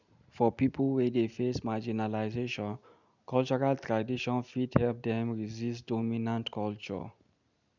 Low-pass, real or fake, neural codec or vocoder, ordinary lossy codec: 7.2 kHz; real; none; none